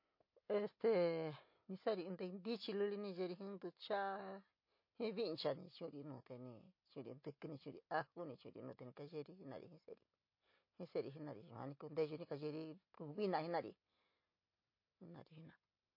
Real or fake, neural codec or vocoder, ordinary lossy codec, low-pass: real; none; MP3, 32 kbps; 5.4 kHz